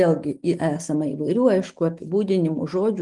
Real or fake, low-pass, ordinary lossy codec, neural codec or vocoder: real; 10.8 kHz; Opus, 24 kbps; none